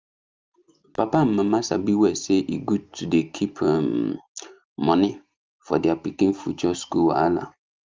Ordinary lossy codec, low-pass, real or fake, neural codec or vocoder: Opus, 24 kbps; 7.2 kHz; real; none